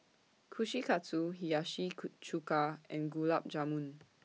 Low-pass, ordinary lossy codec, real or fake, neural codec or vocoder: none; none; real; none